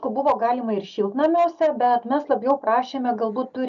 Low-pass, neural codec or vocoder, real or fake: 7.2 kHz; none; real